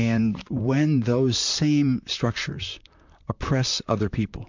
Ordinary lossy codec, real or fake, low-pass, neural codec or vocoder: MP3, 64 kbps; fake; 7.2 kHz; vocoder, 44.1 kHz, 128 mel bands, Pupu-Vocoder